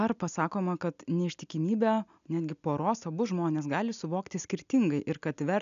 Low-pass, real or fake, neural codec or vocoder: 7.2 kHz; real; none